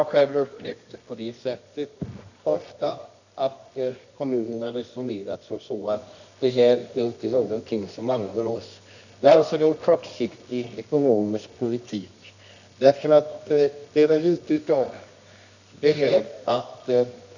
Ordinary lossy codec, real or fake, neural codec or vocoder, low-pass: none; fake; codec, 24 kHz, 0.9 kbps, WavTokenizer, medium music audio release; 7.2 kHz